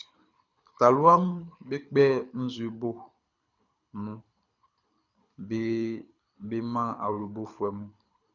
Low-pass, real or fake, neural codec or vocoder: 7.2 kHz; fake; codec, 24 kHz, 6 kbps, HILCodec